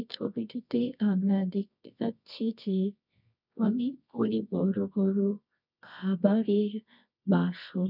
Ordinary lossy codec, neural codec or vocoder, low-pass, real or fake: none; codec, 24 kHz, 0.9 kbps, WavTokenizer, medium music audio release; 5.4 kHz; fake